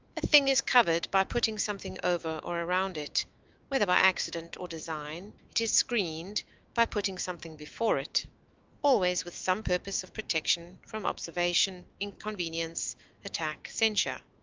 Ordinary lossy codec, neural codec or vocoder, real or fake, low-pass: Opus, 32 kbps; autoencoder, 48 kHz, 128 numbers a frame, DAC-VAE, trained on Japanese speech; fake; 7.2 kHz